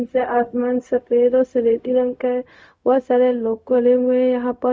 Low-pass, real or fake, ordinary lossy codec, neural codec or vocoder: none; fake; none; codec, 16 kHz, 0.4 kbps, LongCat-Audio-Codec